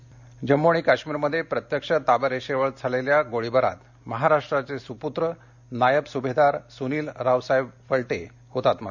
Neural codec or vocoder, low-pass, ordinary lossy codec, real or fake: none; 7.2 kHz; none; real